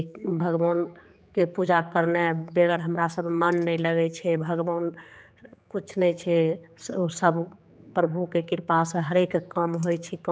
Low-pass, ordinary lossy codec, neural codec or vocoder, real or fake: none; none; codec, 16 kHz, 4 kbps, X-Codec, HuBERT features, trained on general audio; fake